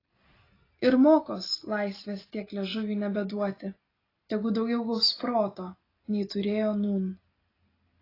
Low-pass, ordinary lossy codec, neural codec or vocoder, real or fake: 5.4 kHz; AAC, 24 kbps; none; real